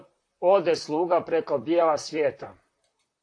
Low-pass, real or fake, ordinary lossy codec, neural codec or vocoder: 9.9 kHz; fake; AAC, 48 kbps; vocoder, 44.1 kHz, 128 mel bands, Pupu-Vocoder